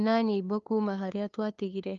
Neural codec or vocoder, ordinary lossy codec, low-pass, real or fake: codec, 16 kHz, 2 kbps, FunCodec, trained on LibriTTS, 25 frames a second; Opus, 32 kbps; 7.2 kHz; fake